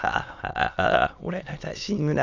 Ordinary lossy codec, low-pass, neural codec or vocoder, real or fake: none; 7.2 kHz; autoencoder, 22.05 kHz, a latent of 192 numbers a frame, VITS, trained on many speakers; fake